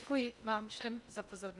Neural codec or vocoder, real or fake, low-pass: codec, 16 kHz in and 24 kHz out, 0.8 kbps, FocalCodec, streaming, 65536 codes; fake; 10.8 kHz